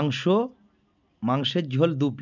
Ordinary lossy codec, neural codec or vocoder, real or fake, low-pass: none; none; real; 7.2 kHz